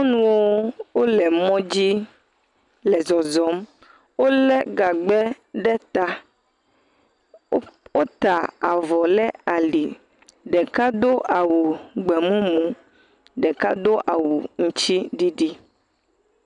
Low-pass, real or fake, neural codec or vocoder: 10.8 kHz; real; none